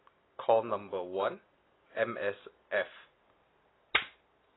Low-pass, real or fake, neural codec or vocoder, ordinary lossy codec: 7.2 kHz; real; none; AAC, 16 kbps